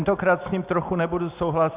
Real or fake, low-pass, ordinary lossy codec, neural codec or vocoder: real; 3.6 kHz; Opus, 64 kbps; none